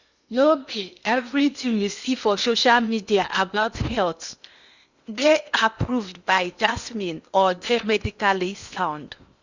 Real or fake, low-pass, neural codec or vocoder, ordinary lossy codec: fake; 7.2 kHz; codec, 16 kHz in and 24 kHz out, 0.8 kbps, FocalCodec, streaming, 65536 codes; Opus, 64 kbps